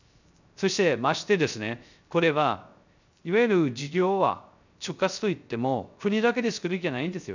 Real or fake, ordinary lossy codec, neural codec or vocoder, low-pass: fake; none; codec, 16 kHz, 0.3 kbps, FocalCodec; 7.2 kHz